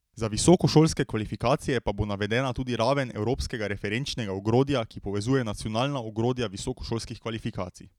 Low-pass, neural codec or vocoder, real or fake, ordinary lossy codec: 19.8 kHz; none; real; none